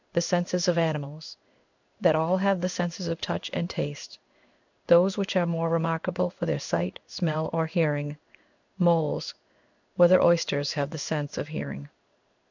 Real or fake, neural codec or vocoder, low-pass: fake; codec, 16 kHz in and 24 kHz out, 1 kbps, XY-Tokenizer; 7.2 kHz